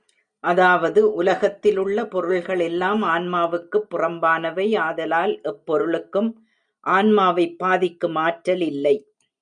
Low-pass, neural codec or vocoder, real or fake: 9.9 kHz; none; real